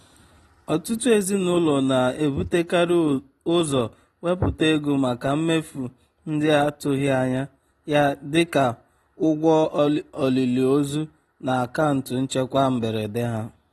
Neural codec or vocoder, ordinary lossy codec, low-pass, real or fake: none; AAC, 32 kbps; 14.4 kHz; real